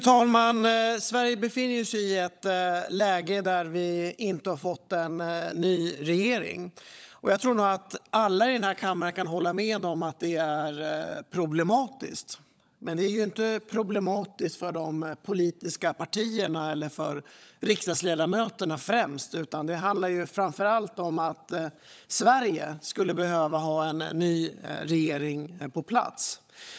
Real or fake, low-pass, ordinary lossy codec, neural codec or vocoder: fake; none; none; codec, 16 kHz, 16 kbps, FunCodec, trained on LibriTTS, 50 frames a second